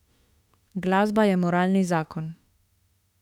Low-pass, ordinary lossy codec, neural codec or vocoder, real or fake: 19.8 kHz; none; autoencoder, 48 kHz, 32 numbers a frame, DAC-VAE, trained on Japanese speech; fake